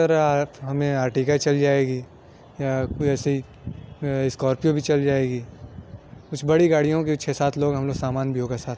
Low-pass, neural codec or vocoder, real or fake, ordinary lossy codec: none; none; real; none